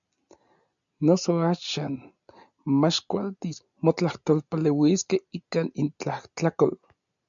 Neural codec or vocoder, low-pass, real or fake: none; 7.2 kHz; real